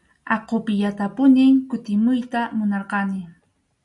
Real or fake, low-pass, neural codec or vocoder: real; 10.8 kHz; none